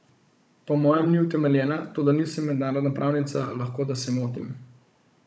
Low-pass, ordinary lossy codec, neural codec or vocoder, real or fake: none; none; codec, 16 kHz, 16 kbps, FunCodec, trained on Chinese and English, 50 frames a second; fake